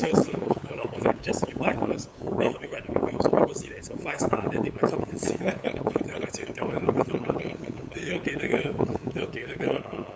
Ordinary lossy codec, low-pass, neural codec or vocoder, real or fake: none; none; codec, 16 kHz, 8 kbps, FunCodec, trained on LibriTTS, 25 frames a second; fake